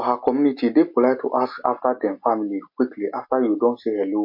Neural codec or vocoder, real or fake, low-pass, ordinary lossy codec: none; real; 5.4 kHz; MP3, 32 kbps